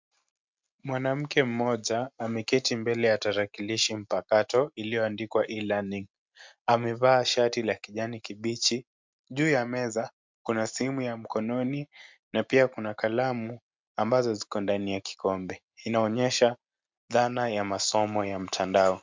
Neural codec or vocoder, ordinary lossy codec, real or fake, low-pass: none; MP3, 64 kbps; real; 7.2 kHz